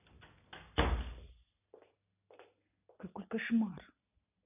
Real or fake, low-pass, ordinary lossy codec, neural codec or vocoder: real; 3.6 kHz; none; none